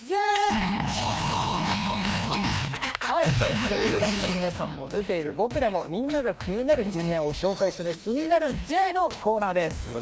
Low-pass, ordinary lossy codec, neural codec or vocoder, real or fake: none; none; codec, 16 kHz, 1 kbps, FreqCodec, larger model; fake